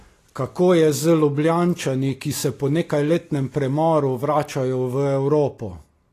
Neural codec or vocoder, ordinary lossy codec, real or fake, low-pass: none; AAC, 48 kbps; real; 14.4 kHz